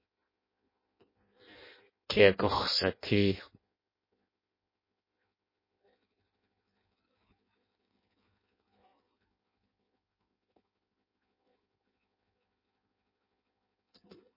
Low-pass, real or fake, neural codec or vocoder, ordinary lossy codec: 5.4 kHz; fake; codec, 16 kHz in and 24 kHz out, 0.6 kbps, FireRedTTS-2 codec; MP3, 24 kbps